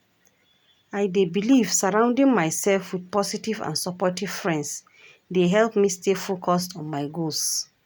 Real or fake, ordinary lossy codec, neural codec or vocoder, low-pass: real; none; none; none